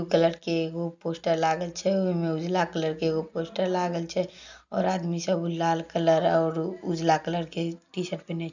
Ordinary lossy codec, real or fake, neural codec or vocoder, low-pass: none; real; none; 7.2 kHz